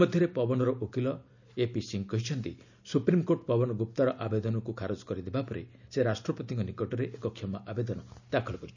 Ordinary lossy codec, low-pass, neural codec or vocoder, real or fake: none; 7.2 kHz; none; real